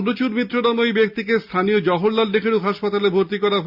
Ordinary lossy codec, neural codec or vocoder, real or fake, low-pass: Opus, 64 kbps; none; real; 5.4 kHz